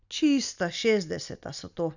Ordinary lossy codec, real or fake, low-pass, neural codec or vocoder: none; real; 7.2 kHz; none